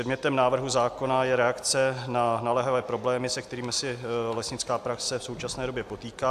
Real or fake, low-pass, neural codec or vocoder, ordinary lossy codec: real; 14.4 kHz; none; AAC, 96 kbps